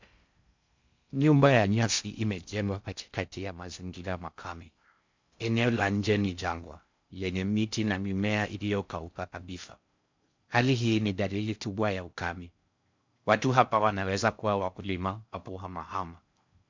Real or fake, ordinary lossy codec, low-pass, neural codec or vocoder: fake; MP3, 64 kbps; 7.2 kHz; codec, 16 kHz in and 24 kHz out, 0.6 kbps, FocalCodec, streaming, 4096 codes